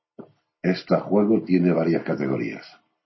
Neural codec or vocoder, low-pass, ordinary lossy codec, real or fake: none; 7.2 kHz; MP3, 24 kbps; real